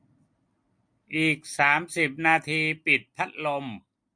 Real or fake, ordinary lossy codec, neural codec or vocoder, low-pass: real; MP3, 48 kbps; none; 9.9 kHz